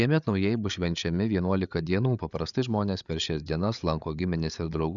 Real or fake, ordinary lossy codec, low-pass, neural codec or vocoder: fake; MP3, 96 kbps; 7.2 kHz; codec, 16 kHz, 8 kbps, FreqCodec, larger model